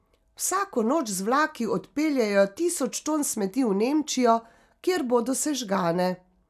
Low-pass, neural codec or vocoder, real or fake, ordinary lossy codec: 14.4 kHz; none; real; none